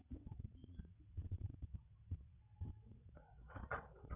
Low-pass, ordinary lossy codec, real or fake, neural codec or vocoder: 3.6 kHz; none; fake; vocoder, 22.05 kHz, 80 mel bands, WaveNeXt